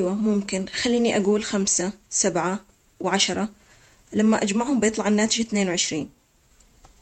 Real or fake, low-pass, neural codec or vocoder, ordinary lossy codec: fake; 19.8 kHz; vocoder, 48 kHz, 128 mel bands, Vocos; MP3, 64 kbps